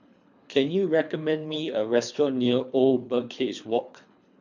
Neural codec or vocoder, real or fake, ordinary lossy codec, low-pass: codec, 24 kHz, 3 kbps, HILCodec; fake; MP3, 64 kbps; 7.2 kHz